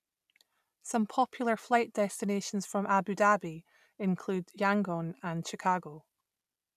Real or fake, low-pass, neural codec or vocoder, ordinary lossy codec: real; 14.4 kHz; none; none